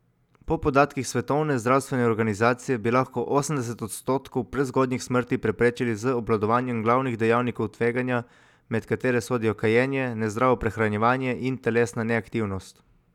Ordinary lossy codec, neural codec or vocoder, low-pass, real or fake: none; none; 19.8 kHz; real